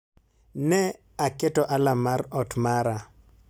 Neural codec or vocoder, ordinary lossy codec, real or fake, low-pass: none; none; real; none